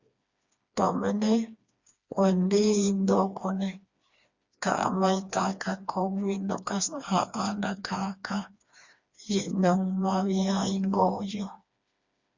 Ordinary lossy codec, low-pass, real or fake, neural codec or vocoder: Opus, 64 kbps; 7.2 kHz; fake; codec, 16 kHz, 2 kbps, FreqCodec, smaller model